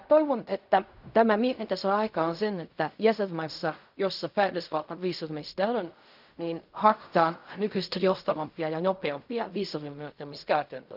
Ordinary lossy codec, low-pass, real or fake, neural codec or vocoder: none; 5.4 kHz; fake; codec, 16 kHz in and 24 kHz out, 0.4 kbps, LongCat-Audio-Codec, fine tuned four codebook decoder